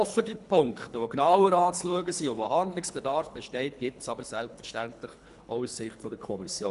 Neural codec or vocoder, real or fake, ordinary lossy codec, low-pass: codec, 24 kHz, 3 kbps, HILCodec; fake; none; 10.8 kHz